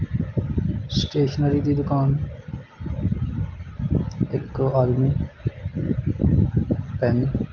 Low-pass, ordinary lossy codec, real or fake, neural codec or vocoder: 7.2 kHz; Opus, 32 kbps; real; none